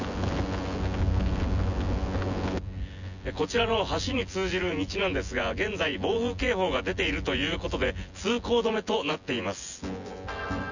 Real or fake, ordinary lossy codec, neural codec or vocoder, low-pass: fake; none; vocoder, 24 kHz, 100 mel bands, Vocos; 7.2 kHz